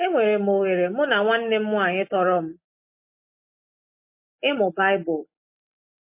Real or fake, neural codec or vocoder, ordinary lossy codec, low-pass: real; none; MP3, 24 kbps; 3.6 kHz